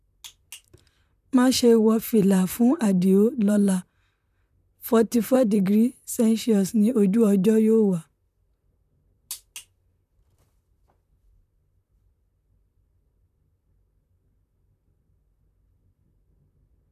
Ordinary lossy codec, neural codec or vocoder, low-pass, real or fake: none; vocoder, 44.1 kHz, 128 mel bands, Pupu-Vocoder; 14.4 kHz; fake